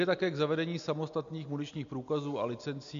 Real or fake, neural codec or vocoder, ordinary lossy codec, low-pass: real; none; MP3, 64 kbps; 7.2 kHz